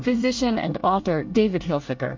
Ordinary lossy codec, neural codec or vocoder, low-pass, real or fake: MP3, 48 kbps; codec, 24 kHz, 1 kbps, SNAC; 7.2 kHz; fake